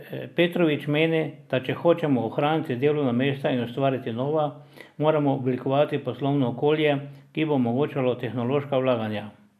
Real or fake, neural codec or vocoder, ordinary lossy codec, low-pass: real; none; none; 14.4 kHz